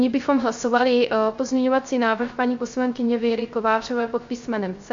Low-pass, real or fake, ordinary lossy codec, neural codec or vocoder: 7.2 kHz; fake; MP3, 48 kbps; codec, 16 kHz, 0.3 kbps, FocalCodec